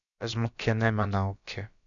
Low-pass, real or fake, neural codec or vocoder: 7.2 kHz; fake; codec, 16 kHz, about 1 kbps, DyCAST, with the encoder's durations